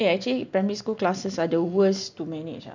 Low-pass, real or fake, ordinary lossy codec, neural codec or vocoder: 7.2 kHz; real; none; none